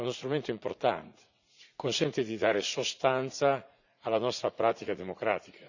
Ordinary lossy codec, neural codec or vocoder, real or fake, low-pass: none; vocoder, 44.1 kHz, 80 mel bands, Vocos; fake; 7.2 kHz